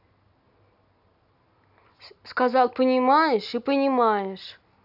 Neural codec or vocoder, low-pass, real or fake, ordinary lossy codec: none; 5.4 kHz; real; none